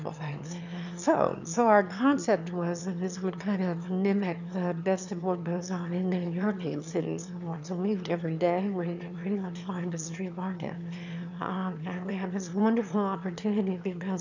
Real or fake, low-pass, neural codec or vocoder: fake; 7.2 kHz; autoencoder, 22.05 kHz, a latent of 192 numbers a frame, VITS, trained on one speaker